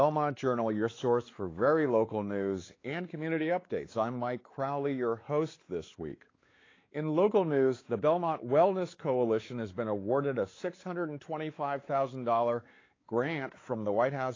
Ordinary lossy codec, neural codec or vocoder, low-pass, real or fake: AAC, 32 kbps; codec, 16 kHz, 4 kbps, X-Codec, WavLM features, trained on Multilingual LibriSpeech; 7.2 kHz; fake